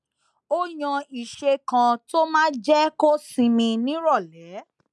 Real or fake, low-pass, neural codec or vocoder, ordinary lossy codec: real; none; none; none